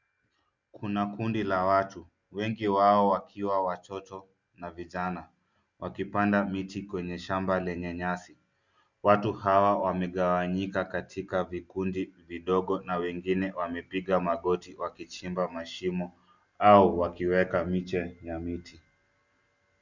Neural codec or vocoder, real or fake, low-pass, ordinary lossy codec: none; real; 7.2 kHz; Opus, 64 kbps